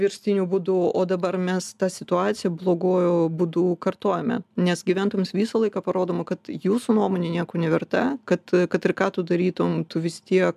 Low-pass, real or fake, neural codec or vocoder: 14.4 kHz; real; none